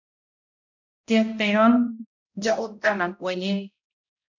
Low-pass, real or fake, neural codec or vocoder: 7.2 kHz; fake; codec, 16 kHz, 0.5 kbps, X-Codec, HuBERT features, trained on balanced general audio